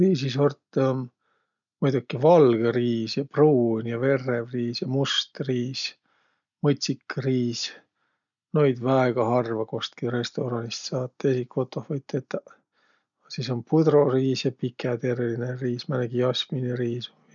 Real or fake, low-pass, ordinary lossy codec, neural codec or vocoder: real; 7.2 kHz; none; none